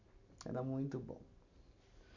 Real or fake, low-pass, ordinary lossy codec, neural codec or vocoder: real; 7.2 kHz; none; none